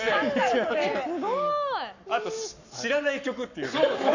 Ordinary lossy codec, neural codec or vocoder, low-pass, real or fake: none; codec, 44.1 kHz, 7.8 kbps, Pupu-Codec; 7.2 kHz; fake